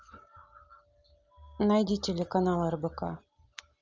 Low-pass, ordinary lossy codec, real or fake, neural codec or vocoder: 7.2 kHz; Opus, 32 kbps; real; none